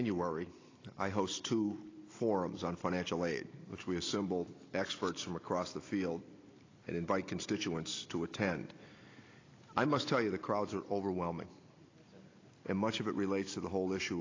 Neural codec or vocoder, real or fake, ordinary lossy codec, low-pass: none; real; AAC, 32 kbps; 7.2 kHz